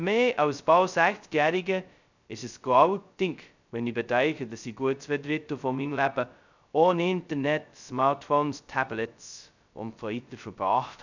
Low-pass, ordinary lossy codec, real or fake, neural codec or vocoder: 7.2 kHz; none; fake; codec, 16 kHz, 0.2 kbps, FocalCodec